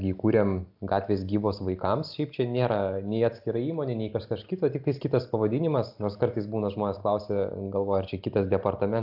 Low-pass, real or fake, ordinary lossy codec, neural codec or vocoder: 5.4 kHz; real; AAC, 48 kbps; none